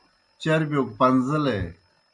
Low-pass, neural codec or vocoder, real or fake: 10.8 kHz; none; real